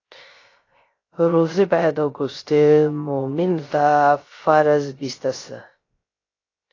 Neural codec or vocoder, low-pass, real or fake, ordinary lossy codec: codec, 16 kHz, 0.3 kbps, FocalCodec; 7.2 kHz; fake; AAC, 32 kbps